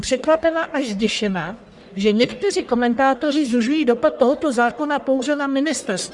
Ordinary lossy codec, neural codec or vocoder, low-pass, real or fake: Opus, 64 kbps; codec, 44.1 kHz, 1.7 kbps, Pupu-Codec; 10.8 kHz; fake